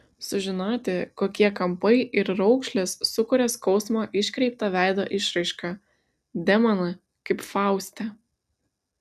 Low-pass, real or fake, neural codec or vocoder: 14.4 kHz; real; none